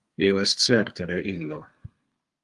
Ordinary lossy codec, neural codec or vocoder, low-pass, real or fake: Opus, 32 kbps; codec, 32 kHz, 1.9 kbps, SNAC; 10.8 kHz; fake